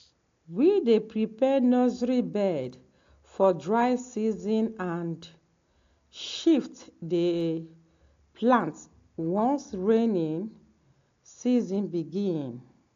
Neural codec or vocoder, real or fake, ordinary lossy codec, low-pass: none; real; AAC, 48 kbps; 7.2 kHz